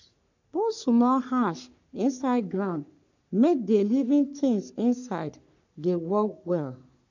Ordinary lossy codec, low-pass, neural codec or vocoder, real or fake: none; 7.2 kHz; codec, 44.1 kHz, 3.4 kbps, Pupu-Codec; fake